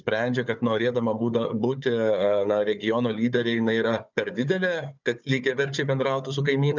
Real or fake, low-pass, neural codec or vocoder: fake; 7.2 kHz; codec, 16 kHz, 4 kbps, FunCodec, trained on Chinese and English, 50 frames a second